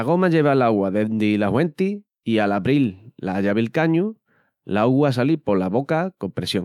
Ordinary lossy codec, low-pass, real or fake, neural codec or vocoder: none; 19.8 kHz; fake; autoencoder, 48 kHz, 128 numbers a frame, DAC-VAE, trained on Japanese speech